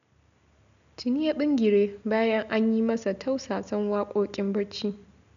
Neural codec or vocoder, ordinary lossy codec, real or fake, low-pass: none; none; real; 7.2 kHz